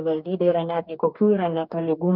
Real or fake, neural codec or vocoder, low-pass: fake; codec, 44.1 kHz, 2.6 kbps, DAC; 5.4 kHz